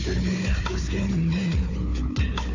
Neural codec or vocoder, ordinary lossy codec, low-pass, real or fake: codec, 16 kHz, 4 kbps, FunCodec, trained on Chinese and English, 50 frames a second; AAC, 48 kbps; 7.2 kHz; fake